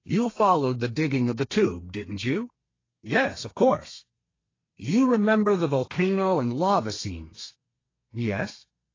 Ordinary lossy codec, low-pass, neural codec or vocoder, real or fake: AAC, 32 kbps; 7.2 kHz; codec, 32 kHz, 1.9 kbps, SNAC; fake